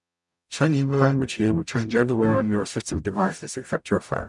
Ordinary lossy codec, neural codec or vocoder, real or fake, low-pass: none; codec, 44.1 kHz, 0.9 kbps, DAC; fake; 10.8 kHz